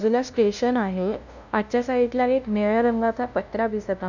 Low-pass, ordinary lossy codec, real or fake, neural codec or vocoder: 7.2 kHz; none; fake; codec, 16 kHz, 0.5 kbps, FunCodec, trained on LibriTTS, 25 frames a second